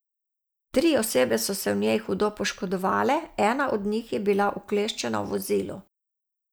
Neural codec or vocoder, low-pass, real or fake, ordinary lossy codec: none; none; real; none